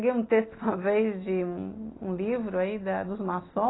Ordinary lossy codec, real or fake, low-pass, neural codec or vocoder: AAC, 16 kbps; real; 7.2 kHz; none